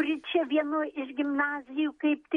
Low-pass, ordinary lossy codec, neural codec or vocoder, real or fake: 19.8 kHz; MP3, 48 kbps; none; real